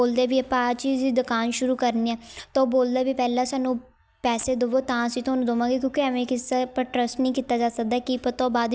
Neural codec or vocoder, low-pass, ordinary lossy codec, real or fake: none; none; none; real